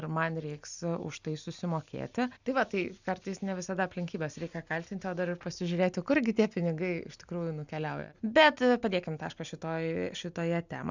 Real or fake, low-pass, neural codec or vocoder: real; 7.2 kHz; none